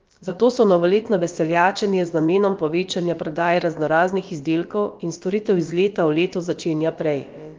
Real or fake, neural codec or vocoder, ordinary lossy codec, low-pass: fake; codec, 16 kHz, about 1 kbps, DyCAST, with the encoder's durations; Opus, 32 kbps; 7.2 kHz